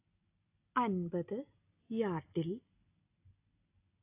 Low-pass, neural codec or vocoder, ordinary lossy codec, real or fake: 3.6 kHz; none; AAC, 32 kbps; real